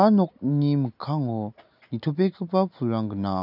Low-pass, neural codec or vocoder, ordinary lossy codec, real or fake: 5.4 kHz; none; none; real